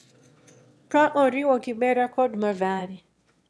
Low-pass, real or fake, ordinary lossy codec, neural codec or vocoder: none; fake; none; autoencoder, 22.05 kHz, a latent of 192 numbers a frame, VITS, trained on one speaker